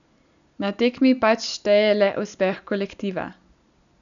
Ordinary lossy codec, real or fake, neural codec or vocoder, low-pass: none; real; none; 7.2 kHz